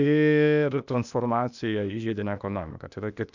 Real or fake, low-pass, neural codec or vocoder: fake; 7.2 kHz; codec, 16 kHz, 0.8 kbps, ZipCodec